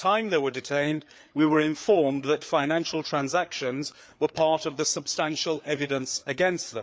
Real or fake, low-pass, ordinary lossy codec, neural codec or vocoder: fake; none; none; codec, 16 kHz, 4 kbps, FreqCodec, larger model